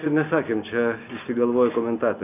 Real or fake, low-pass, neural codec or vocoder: real; 3.6 kHz; none